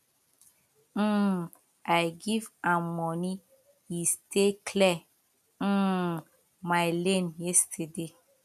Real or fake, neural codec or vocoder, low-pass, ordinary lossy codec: real; none; 14.4 kHz; none